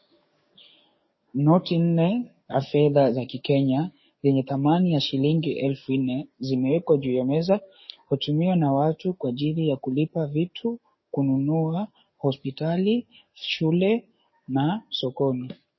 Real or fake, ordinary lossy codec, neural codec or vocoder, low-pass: fake; MP3, 24 kbps; codec, 44.1 kHz, 7.8 kbps, DAC; 7.2 kHz